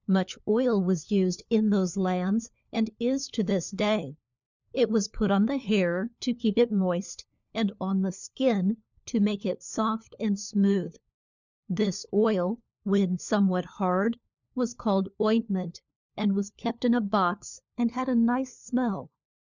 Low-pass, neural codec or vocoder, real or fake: 7.2 kHz; codec, 16 kHz, 4 kbps, FunCodec, trained on LibriTTS, 50 frames a second; fake